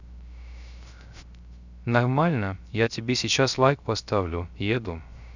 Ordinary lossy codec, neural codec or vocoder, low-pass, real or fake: none; codec, 16 kHz, 0.3 kbps, FocalCodec; 7.2 kHz; fake